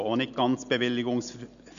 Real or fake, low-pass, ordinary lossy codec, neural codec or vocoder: real; 7.2 kHz; none; none